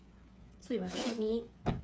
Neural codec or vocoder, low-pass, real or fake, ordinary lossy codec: codec, 16 kHz, 8 kbps, FreqCodec, smaller model; none; fake; none